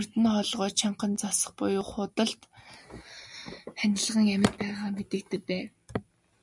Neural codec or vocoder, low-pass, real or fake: none; 10.8 kHz; real